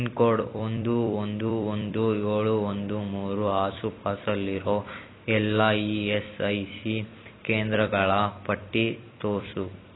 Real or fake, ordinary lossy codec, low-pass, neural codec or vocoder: fake; AAC, 16 kbps; 7.2 kHz; vocoder, 44.1 kHz, 128 mel bands every 256 samples, BigVGAN v2